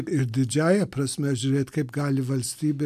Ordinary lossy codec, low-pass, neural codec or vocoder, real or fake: AAC, 96 kbps; 14.4 kHz; none; real